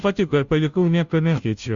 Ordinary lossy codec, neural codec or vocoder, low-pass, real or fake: Opus, 64 kbps; codec, 16 kHz, 0.5 kbps, FunCodec, trained on Chinese and English, 25 frames a second; 7.2 kHz; fake